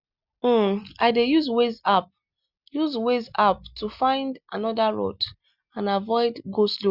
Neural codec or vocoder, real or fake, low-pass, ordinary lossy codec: none; real; 5.4 kHz; Opus, 64 kbps